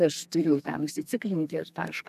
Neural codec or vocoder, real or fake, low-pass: codec, 32 kHz, 1.9 kbps, SNAC; fake; 14.4 kHz